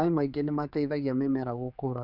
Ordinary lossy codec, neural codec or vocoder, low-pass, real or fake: none; codec, 16 kHz, 4 kbps, X-Codec, HuBERT features, trained on general audio; 5.4 kHz; fake